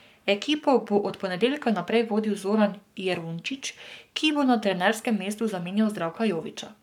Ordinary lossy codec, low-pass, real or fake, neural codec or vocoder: none; 19.8 kHz; fake; codec, 44.1 kHz, 7.8 kbps, Pupu-Codec